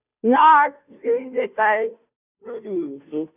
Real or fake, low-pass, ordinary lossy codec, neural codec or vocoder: fake; 3.6 kHz; none; codec, 16 kHz, 0.5 kbps, FunCodec, trained on Chinese and English, 25 frames a second